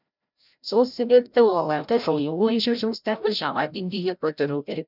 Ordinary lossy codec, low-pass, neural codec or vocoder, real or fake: none; 5.4 kHz; codec, 16 kHz, 0.5 kbps, FreqCodec, larger model; fake